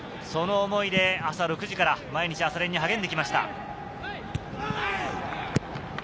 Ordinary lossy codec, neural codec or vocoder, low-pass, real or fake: none; none; none; real